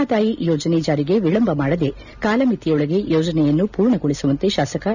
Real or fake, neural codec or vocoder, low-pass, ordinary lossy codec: real; none; 7.2 kHz; none